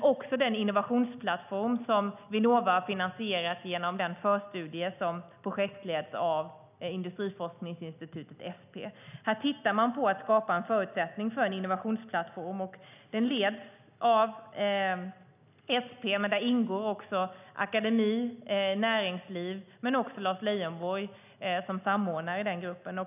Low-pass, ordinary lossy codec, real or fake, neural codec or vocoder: 3.6 kHz; none; real; none